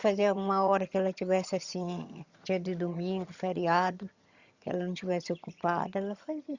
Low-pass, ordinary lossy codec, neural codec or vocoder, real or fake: 7.2 kHz; Opus, 64 kbps; vocoder, 22.05 kHz, 80 mel bands, HiFi-GAN; fake